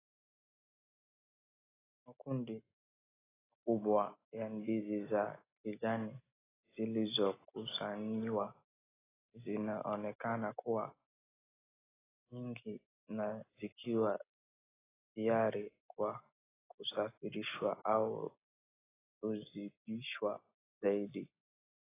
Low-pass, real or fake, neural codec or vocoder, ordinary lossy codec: 3.6 kHz; real; none; AAC, 16 kbps